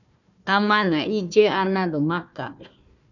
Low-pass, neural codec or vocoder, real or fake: 7.2 kHz; codec, 16 kHz, 1 kbps, FunCodec, trained on Chinese and English, 50 frames a second; fake